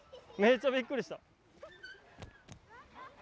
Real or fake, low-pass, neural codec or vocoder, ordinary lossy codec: real; none; none; none